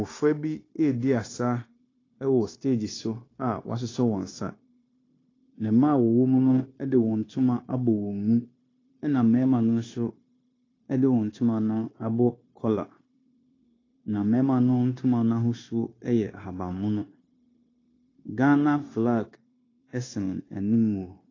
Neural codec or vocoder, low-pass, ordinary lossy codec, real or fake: codec, 16 kHz, 0.9 kbps, LongCat-Audio-Codec; 7.2 kHz; AAC, 32 kbps; fake